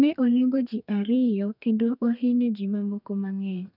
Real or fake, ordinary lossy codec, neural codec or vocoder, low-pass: fake; none; codec, 32 kHz, 1.9 kbps, SNAC; 5.4 kHz